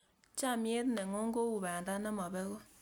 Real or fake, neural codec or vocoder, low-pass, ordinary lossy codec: real; none; none; none